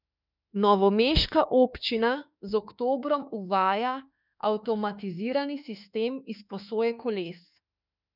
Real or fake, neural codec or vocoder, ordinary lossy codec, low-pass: fake; autoencoder, 48 kHz, 32 numbers a frame, DAC-VAE, trained on Japanese speech; none; 5.4 kHz